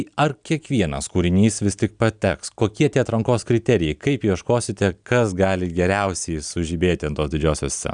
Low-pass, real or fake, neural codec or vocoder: 9.9 kHz; real; none